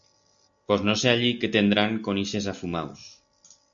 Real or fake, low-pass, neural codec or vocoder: real; 7.2 kHz; none